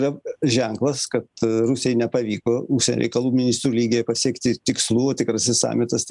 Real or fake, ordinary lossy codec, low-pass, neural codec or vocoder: real; MP3, 96 kbps; 10.8 kHz; none